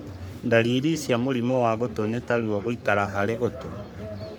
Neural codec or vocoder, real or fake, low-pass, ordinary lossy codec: codec, 44.1 kHz, 3.4 kbps, Pupu-Codec; fake; none; none